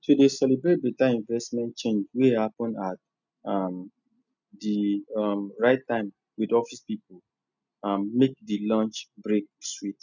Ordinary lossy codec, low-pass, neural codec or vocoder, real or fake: none; 7.2 kHz; none; real